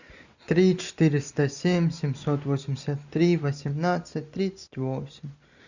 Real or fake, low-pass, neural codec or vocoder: real; 7.2 kHz; none